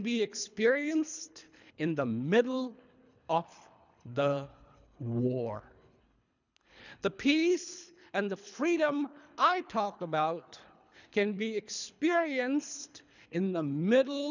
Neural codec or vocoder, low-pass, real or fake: codec, 24 kHz, 3 kbps, HILCodec; 7.2 kHz; fake